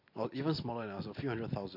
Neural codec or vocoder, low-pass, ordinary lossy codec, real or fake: none; 5.4 kHz; MP3, 32 kbps; real